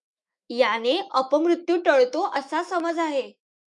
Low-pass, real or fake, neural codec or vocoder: 10.8 kHz; fake; autoencoder, 48 kHz, 128 numbers a frame, DAC-VAE, trained on Japanese speech